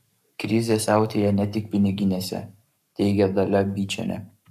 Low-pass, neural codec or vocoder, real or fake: 14.4 kHz; codec, 44.1 kHz, 7.8 kbps, Pupu-Codec; fake